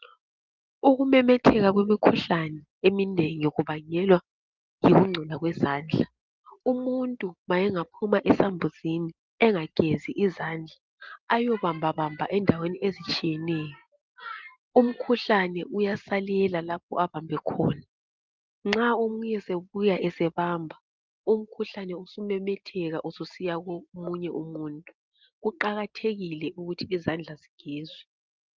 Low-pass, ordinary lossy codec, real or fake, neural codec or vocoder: 7.2 kHz; Opus, 24 kbps; real; none